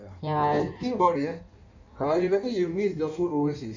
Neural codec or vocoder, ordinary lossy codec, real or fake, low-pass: codec, 16 kHz in and 24 kHz out, 1.1 kbps, FireRedTTS-2 codec; none; fake; 7.2 kHz